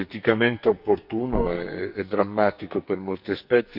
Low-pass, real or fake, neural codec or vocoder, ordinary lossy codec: 5.4 kHz; fake; codec, 44.1 kHz, 2.6 kbps, SNAC; none